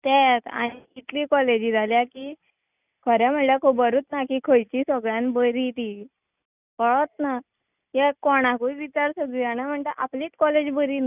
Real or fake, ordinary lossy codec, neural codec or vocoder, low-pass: real; none; none; 3.6 kHz